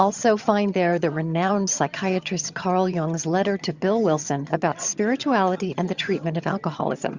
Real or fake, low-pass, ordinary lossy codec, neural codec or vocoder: fake; 7.2 kHz; Opus, 64 kbps; vocoder, 22.05 kHz, 80 mel bands, HiFi-GAN